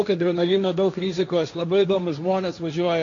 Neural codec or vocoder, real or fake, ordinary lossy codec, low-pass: codec, 16 kHz, 1.1 kbps, Voila-Tokenizer; fake; AAC, 48 kbps; 7.2 kHz